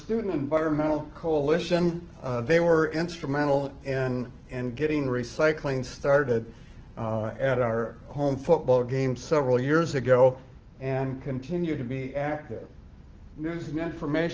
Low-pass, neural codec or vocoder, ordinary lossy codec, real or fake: 7.2 kHz; autoencoder, 48 kHz, 128 numbers a frame, DAC-VAE, trained on Japanese speech; Opus, 16 kbps; fake